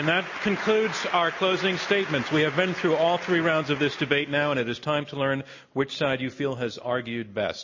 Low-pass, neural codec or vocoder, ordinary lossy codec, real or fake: 7.2 kHz; none; MP3, 32 kbps; real